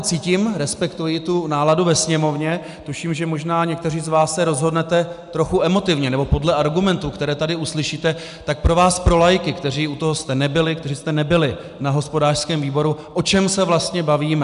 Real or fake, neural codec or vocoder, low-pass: real; none; 10.8 kHz